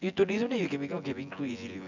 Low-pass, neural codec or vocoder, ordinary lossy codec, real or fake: 7.2 kHz; vocoder, 24 kHz, 100 mel bands, Vocos; none; fake